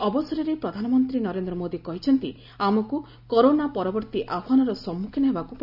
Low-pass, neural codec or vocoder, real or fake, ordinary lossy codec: 5.4 kHz; none; real; none